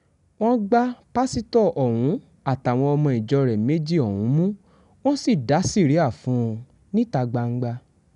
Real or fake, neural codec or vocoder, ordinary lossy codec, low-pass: real; none; none; 10.8 kHz